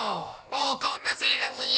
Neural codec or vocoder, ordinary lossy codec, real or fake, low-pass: codec, 16 kHz, about 1 kbps, DyCAST, with the encoder's durations; none; fake; none